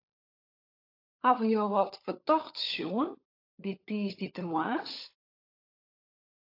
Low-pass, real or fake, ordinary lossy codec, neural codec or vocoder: 5.4 kHz; fake; AAC, 32 kbps; codec, 16 kHz, 16 kbps, FunCodec, trained on LibriTTS, 50 frames a second